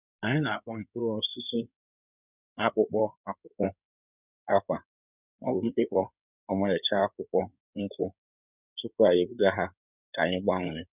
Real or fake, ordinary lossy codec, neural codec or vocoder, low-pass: fake; none; codec, 16 kHz in and 24 kHz out, 2.2 kbps, FireRedTTS-2 codec; 3.6 kHz